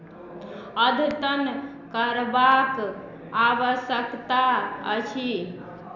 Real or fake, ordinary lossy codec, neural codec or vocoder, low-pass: real; none; none; 7.2 kHz